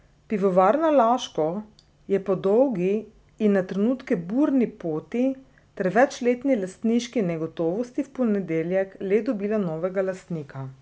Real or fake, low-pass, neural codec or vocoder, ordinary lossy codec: real; none; none; none